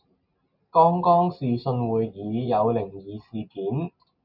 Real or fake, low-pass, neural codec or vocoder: real; 5.4 kHz; none